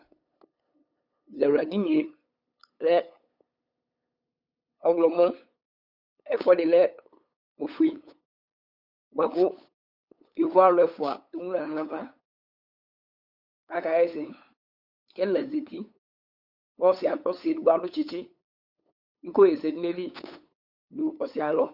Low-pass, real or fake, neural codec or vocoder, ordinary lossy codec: 5.4 kHz; fake; codec, 16 kHz, 8 kbps, FunCodec, trained on LibriTTS, 25 frames a second; Opus, 64 kbps